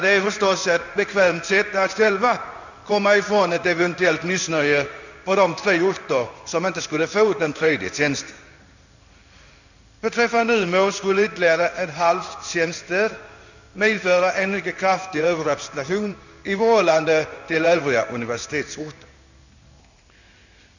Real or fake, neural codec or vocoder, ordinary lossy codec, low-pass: fake; codec, 16 kHz in and 24 kHz out, 1 kbps, XY-Tokenizer; none; 7.2 kHz